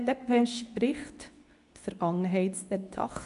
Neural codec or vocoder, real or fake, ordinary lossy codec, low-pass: codec, 24 kHz, 0.9 kbps, WavTokenizer, medium speech release version 2; fake; none; 10.8 kHz